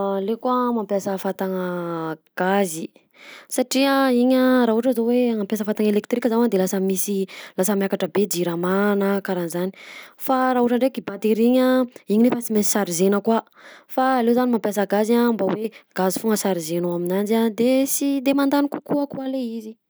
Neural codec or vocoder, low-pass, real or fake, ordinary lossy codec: none; none; real; none